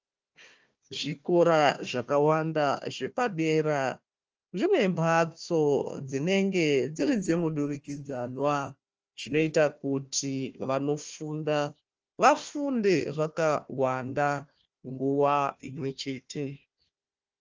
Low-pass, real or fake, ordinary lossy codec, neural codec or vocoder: 7.2 kHz; fake; Opus, 24 kbps; codec, 16 kHz, 1 kbps, FunCodec, trained on Chinese and English, 50 frames a second